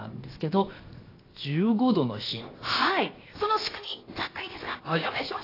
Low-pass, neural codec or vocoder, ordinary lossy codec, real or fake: 5.4 kHz; codec, 16 kHz, 0.7 kbps, FocalCodec; AAC, 24 kbps; fake